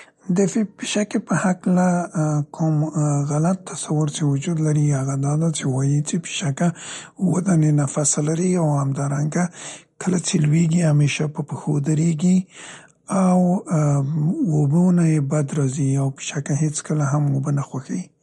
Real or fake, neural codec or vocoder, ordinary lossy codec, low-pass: real; none; MP3, 48 kbps; 19.8 kHz